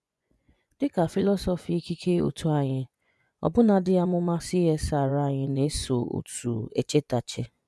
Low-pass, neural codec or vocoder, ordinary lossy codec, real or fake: none; vocoder, 24 kHz, 100 mel bands, Vocos; none; fake